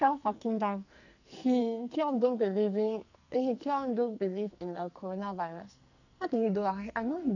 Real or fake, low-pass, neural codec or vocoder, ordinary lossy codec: fake; 7.2 kHz; codec, 44.1 kHz, 2.6 kbps, SNAC; none